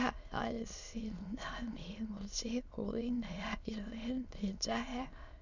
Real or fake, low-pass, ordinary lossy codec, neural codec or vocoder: fake; 7.2 kHz; none; autoencoder, 22.05 kHz, a latent of 192 numbers a frame, VITS, trained on many speakers